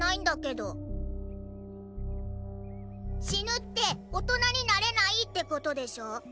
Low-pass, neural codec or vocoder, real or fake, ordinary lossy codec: none; none; real; none